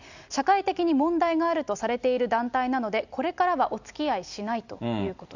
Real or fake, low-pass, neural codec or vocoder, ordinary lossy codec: real; 7.2 kHz; none; none